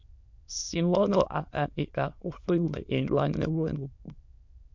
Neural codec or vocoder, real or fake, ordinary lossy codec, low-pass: autoencoder, 22.05 kHz, a latent of 192 numbers a frame, VITS, trained on many speakers; fake; MP3, 64 kbps; 7.2 kHz